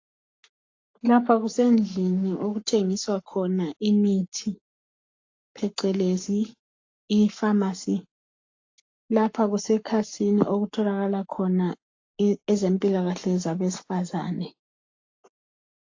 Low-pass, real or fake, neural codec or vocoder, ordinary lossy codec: 7.2 kHz; fake; codec, 44.1 kHz, 7.8 kbps, Pupu-Codec; AAC, 48 kbps